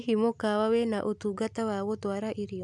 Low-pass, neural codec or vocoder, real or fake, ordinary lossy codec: none; none; real; none